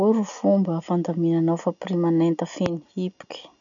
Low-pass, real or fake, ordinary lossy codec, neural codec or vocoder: 7.2 kHz; real; none; none